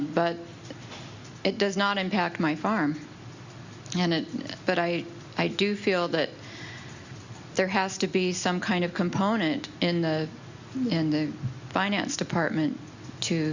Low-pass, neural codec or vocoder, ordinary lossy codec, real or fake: 7.2 kHz; none; Opus, 64 kbps; real